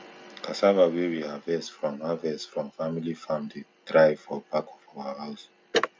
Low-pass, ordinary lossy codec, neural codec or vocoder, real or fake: none; none; none; real